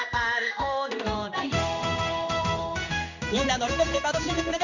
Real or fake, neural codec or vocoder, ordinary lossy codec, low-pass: fake; codec, 16 kHz in and 24 kHz out, 1 kbps, XY-Tokenizer; none; 7.2 kHz